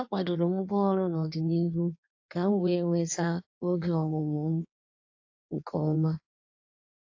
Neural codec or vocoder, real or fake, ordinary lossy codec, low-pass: codec, 16 kHz in and 24 kHz out, 1.1 kbps, FireRedTTS-2 codec; fake; AAC, 48 kbps; 7.2 kHz